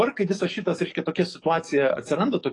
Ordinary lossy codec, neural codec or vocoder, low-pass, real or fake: AAC, 32 kbps; codec, 44.1 kHz, 7.8 kbps, DAC; 10.8 kHz; fake